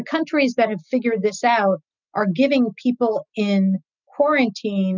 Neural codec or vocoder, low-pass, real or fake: none; 7.2 kHz; real